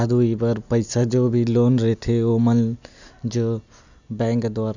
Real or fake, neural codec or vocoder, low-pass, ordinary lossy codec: real; none; 7.2 kHz; none